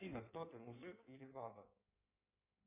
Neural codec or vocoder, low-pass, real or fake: codec, 16 kHz in and 24 kHz out, 1.1 kbps, FireRedTTS-2 codec; 3.6 kHz; fake